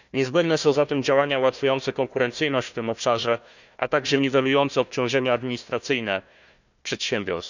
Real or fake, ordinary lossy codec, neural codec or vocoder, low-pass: fake; none; codec, 16 kHz, 1 kbps, FunCodec, trained on Chinese and English, 50 frames a second; 7.2 kHz